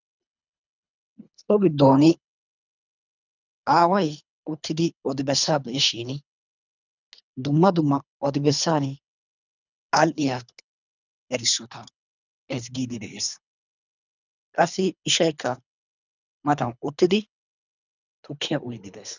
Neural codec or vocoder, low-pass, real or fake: codec, 24 kHz, 3 kbps, HILCodec; 7.2 kHz; fake